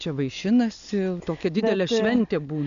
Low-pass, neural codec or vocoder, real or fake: 7.2 kHz; none; real